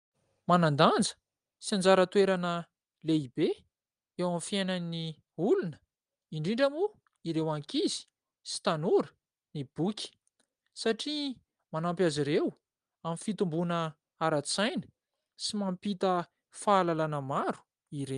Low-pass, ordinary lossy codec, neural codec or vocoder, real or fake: 9.9 kHz; Opus, 32 kbps; none; real